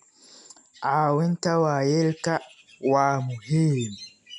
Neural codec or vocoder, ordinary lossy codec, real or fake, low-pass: none; none; real; 10.8 kHz